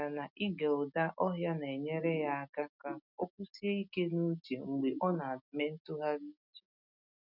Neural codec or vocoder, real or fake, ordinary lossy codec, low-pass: none; real; none; 5.4 kHz